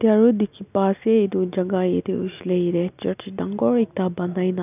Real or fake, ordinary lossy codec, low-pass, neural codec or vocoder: real; none; 3.6 kHz; none